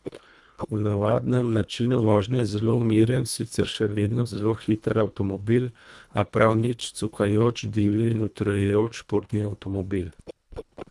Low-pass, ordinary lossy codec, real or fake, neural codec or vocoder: none; none; fake; codec, 24 kHz, 1.5 kbps, HILCodec